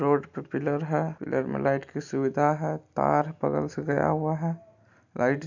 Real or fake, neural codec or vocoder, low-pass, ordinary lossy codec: real; none; 7.2 kHz; none